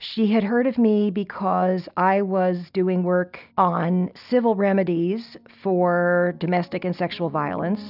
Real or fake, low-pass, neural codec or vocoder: real; 5.4 kHz; none